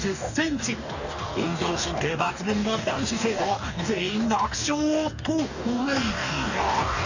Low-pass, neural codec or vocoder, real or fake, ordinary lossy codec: 7.2 kHz; codec, 44.1 kHz, 2.6 kbps, DAC; fake; none